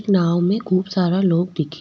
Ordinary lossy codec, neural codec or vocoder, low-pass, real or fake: none; none; none; real